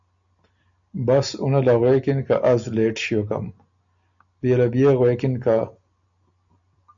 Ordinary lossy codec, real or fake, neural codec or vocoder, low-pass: AAC, 64 kbps; real; none; 7.2 kHz